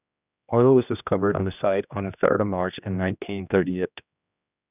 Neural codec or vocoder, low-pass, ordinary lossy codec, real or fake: codec, 16 kHz, 1 kbps, X-Codec, HuBERT features, trained on general audio; 3.6 kHz; none; fake